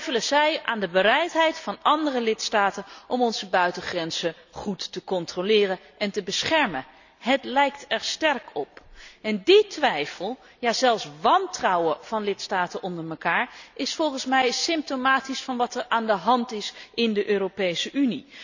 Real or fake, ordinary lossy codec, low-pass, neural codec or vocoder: real; none; 7.2 kHz; none